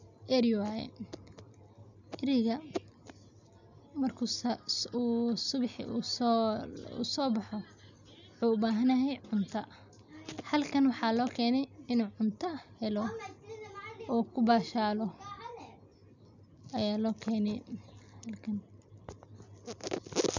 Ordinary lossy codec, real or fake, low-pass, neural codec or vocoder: none; real; 7.2 kHz; none